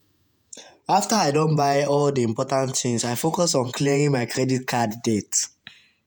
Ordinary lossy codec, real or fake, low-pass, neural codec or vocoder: none; fake; none; vocoder, 48 kHz, 128 mel bands, Vocos